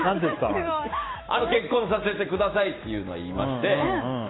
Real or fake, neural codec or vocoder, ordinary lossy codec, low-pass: fake; autoencoder, 48 kHz, 128 numbers a frame, DAC-VAE, trained on Japanese speech; AAC, 16 kbps; 7.2 kHz